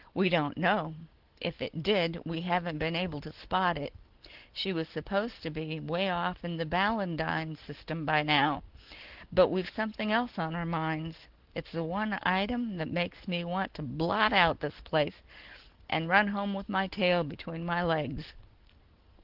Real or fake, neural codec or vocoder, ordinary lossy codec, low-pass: real; none; Opus, 16 kbps; 5.4 kHz